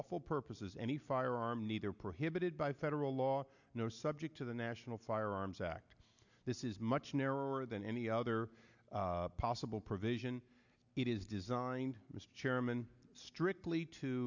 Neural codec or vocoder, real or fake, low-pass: none; real; 7.2 kHz